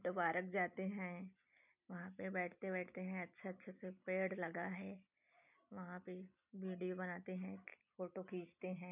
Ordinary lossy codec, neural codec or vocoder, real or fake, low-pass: none; none; real; 3.6 kHz